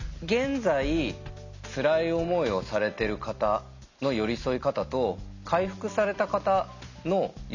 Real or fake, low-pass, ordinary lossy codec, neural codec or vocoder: real; 7.2 kHz; none; none